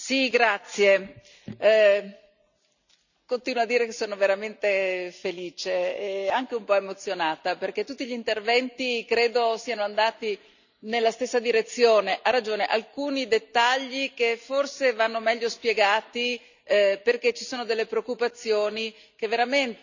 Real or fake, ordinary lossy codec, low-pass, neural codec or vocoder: real; none; 7.2 kHz; none